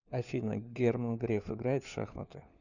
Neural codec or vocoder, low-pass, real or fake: codec, 16 kHz, 4 kbps, FunCodec, trained on LibriTTS, 50 frames a second; 7.2 kHz; fake